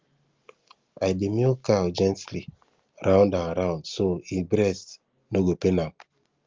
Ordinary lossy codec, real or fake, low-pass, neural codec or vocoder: Opus, 32 kbps; real; 7.2 kHz; none